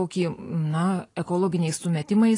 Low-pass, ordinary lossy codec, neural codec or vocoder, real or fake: 10.8 kHz; AAC, 32 kbps; none; real